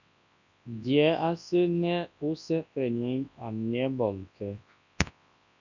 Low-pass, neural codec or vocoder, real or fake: 7.2 kHz; codec, 24 kHz, 0.9 kbps, WavTokenizer, large speech release; fake